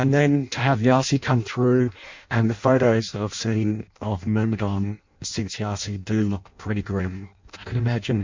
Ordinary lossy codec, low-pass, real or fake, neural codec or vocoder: MP3, 64 kbps; 7.2 kHz; fake; codec, 16 kHz in and 24 kHz out, 0.6 kbps, FireRedTTS-2 codec